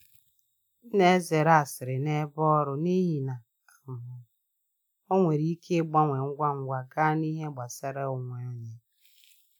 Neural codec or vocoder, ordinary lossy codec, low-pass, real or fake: none; none; none; real